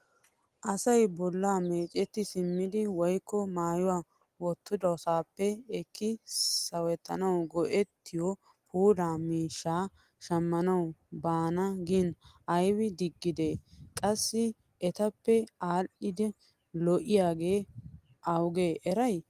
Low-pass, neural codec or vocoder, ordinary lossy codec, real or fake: 14.4 kHz; none; Opus, 24 kbps; real